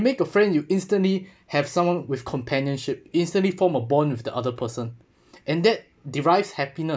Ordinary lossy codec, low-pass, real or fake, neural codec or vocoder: none; none; real; none